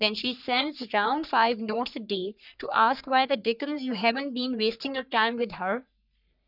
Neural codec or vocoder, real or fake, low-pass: codec, 44.1 kHz, 3.4 kbps, Pupu-Codec; fake; 5.4 kHz